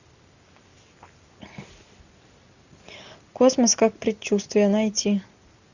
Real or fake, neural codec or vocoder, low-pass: real; none; 7.2 kHz